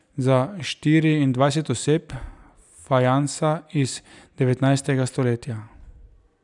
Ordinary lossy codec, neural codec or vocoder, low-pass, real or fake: none; none; 10.8 kHz; real